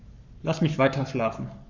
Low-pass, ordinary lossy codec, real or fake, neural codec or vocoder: 7.2 kHz; none; fake; codec, 44.1 kHz, 7.8 kbps, Pupu-Codec